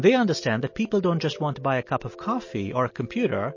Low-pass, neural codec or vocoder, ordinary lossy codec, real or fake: 7.2 kHz; none; MP3, 32 kbps; real